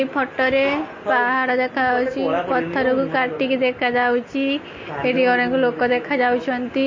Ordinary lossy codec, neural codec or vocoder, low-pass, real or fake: MP3, 32 kbps; none; 7.2 kHz; real